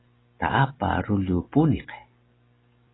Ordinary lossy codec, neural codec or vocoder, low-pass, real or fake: AAC, 16 kbps; none; 7.2 kHz; real